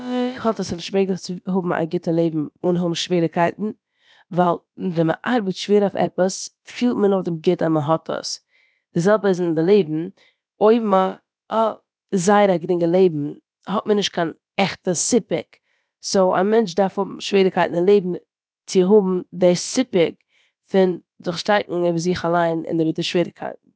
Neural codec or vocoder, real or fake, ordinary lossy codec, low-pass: codec, 16 kHz, about 1 kbps, DyCAST, with the encoder's durations; fake; none; none